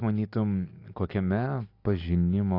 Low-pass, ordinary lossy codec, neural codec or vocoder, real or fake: 5.4 kHz; AAC, 32 kbps; none; real